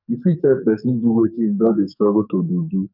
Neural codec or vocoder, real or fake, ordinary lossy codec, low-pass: codec, 44.1 kHz, 2.6 kbps, SNAC; fake; none; 5.4 kHz